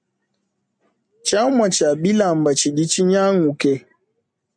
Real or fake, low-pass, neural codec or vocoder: real; 9.9 kHz; none